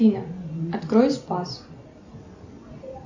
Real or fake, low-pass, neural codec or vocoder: real; 7.2 kHz; none